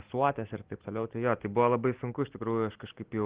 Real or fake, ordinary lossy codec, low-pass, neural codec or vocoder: real; Opus, 16 kbps; 3.6 kHz; none